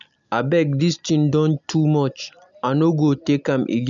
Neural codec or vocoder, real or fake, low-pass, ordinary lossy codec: none; real; 7.2 kHz; none